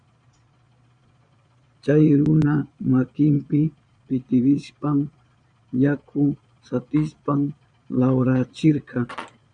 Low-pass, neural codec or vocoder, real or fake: 9.9 kHz; vocoder, 22.05 kHz, 80 mel bands, Vocos; fake